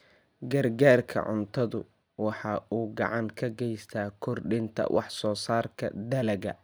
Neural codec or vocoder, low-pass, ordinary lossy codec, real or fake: none; none; none; real